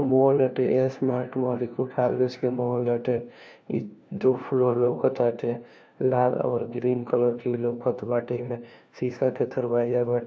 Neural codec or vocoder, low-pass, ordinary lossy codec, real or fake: codec, 16 kHz, 1 kbps, FunCodec, trained on LibriTTS, 50 frames a second; none; none; fake